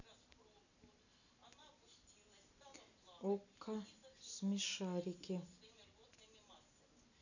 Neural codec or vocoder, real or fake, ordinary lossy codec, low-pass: none; real; none; 7.2 kHz